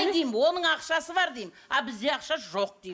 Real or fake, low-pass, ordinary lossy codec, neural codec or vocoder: real; none; none; none